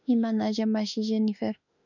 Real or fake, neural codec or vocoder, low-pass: fake; autoencoder, 48 kHz, 32 numbers a frame, DAC-VAE, trained on Japanese speech; 7.2 kHz